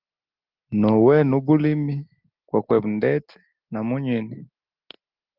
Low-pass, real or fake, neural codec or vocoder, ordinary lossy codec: 5.4 kHz; real; none; Opus, 32 kbps